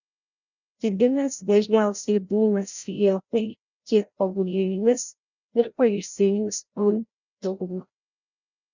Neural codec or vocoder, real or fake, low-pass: codec, 16 kHz, 0.5 kbps, FreqCodec, larger model; fake; 7.2 kHz